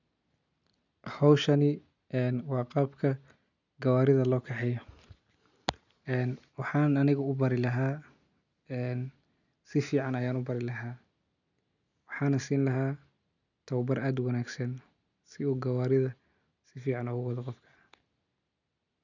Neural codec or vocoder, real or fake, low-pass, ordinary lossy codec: none; real; 7.2 kHz; none